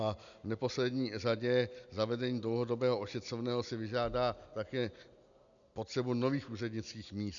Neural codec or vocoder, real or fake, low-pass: none; real; 7.2 kHz